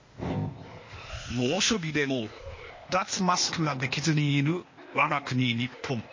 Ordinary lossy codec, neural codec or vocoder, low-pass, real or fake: MP3, 32 kbps; codec, 16 kHz, 0.8 kbps, ZipCodec; 7.2 kHz; fake